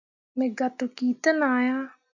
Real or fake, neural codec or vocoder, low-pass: real; none; 7.2 kHz